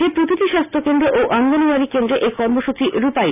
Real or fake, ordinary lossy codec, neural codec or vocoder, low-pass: real; none; none; 3.6 kHz